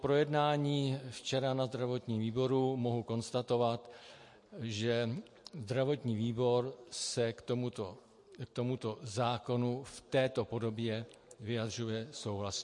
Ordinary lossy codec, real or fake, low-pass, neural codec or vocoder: MP3, 48 kbps; real; 10.8 kHz; none